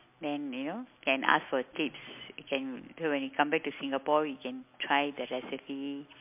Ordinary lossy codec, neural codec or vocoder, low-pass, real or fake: MP3, 32 kbps; none; 3.6 kHz; real